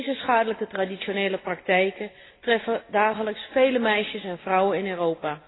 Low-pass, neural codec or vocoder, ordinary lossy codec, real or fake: 7.2 kHz; none; AAC, 16 kbps; real